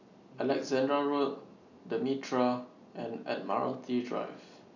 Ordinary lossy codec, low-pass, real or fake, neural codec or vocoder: none; 7.2 kHz; real; none